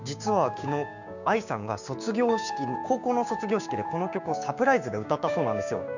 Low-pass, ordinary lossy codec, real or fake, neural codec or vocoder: 7.2 kHz; none; fake; codec, 16 kHz, 6 kbps, DAC